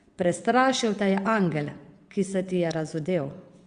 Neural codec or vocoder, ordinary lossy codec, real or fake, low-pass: vocoder, 22.05 kHz, 80 mel bands, WaveNeXt; Opus, 64 kbps; fake; 9.9 kHz